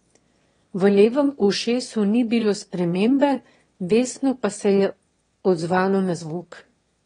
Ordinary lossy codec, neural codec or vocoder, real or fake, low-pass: AAC, 32 kbps; autoencoder, 22.05 kHz, a latent of 192 numbers a frame, VITS, trained on one speaker; fake; 9.9 kHz